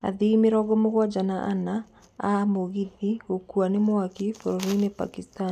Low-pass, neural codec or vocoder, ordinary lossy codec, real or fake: 14.4 kHz; none; none; real